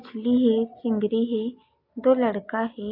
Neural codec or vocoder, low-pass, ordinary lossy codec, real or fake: none; 5.4 kHz; MP3, 32 kbps; real